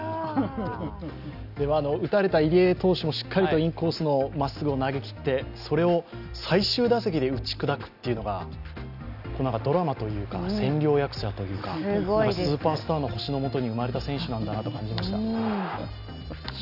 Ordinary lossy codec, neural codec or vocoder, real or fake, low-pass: none; none; real; 5.4 kHz